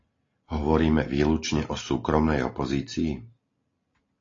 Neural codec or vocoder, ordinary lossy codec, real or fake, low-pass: none; AAC, 32 kbps; real; 7.2 kHz